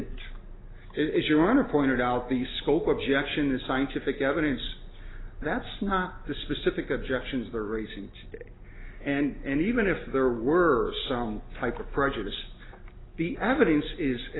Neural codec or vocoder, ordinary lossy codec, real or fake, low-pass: none; AAC, 16 kbps; real; 7.2 kHz